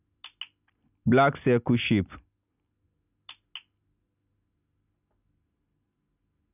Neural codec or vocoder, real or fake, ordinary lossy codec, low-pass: none; real; none; 3.6 kHz